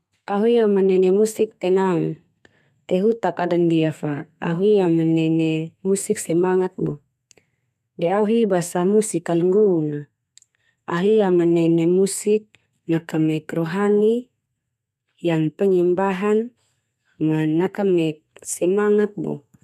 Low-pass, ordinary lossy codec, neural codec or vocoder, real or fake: 14.4 kHz; none; codec, 32 kHz, 1.9 kbps, SNAC; fake